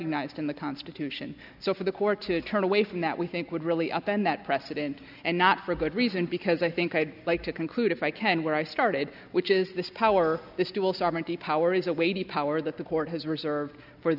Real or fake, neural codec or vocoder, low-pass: real; none; 5.4 kHz